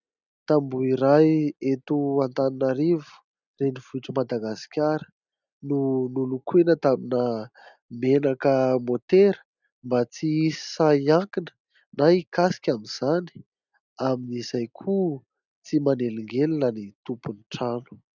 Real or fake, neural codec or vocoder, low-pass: real; none; 7.2 kHz